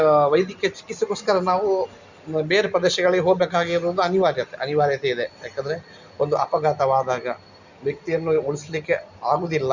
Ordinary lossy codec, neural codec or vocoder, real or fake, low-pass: Opus, 64 kbps; none; real; 7.2 kHz